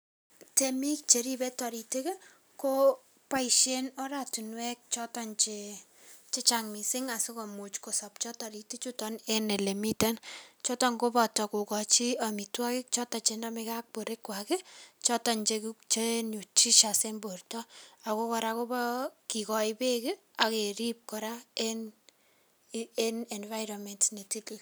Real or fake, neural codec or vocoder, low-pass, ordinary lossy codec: real; none; none; none